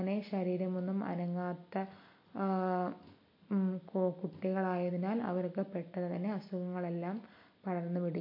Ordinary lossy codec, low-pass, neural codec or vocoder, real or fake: MP3, 32 kbps; 5.4 kHz; none; real